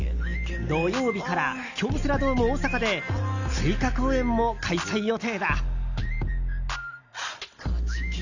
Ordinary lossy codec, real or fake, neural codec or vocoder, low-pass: none; real; none; 7.2 kHz